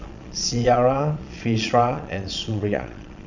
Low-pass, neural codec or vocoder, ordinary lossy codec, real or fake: 7.2 kHz; vocoder, 22.05 kHz, 80 mel bands, Vocos; none; fake